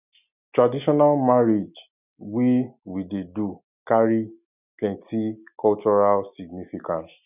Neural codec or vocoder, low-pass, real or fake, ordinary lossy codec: none; 3.6 kHz; real; none